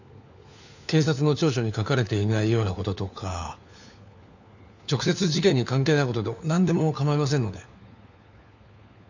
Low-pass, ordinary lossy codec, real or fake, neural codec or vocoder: 7.2 kHz; none; fake; codec, 16 kHz, 4 kbps, FunCodec, trained on LibriTTS, 50 frames a second